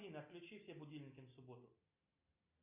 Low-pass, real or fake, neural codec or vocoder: 3.6 kHz; real; none